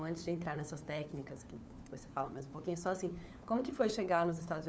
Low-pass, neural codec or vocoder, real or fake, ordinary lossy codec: none; codec, 16 kHz, 4 kbps, FunCodec, trained on LibriTTS, 50 frames a second; fake; none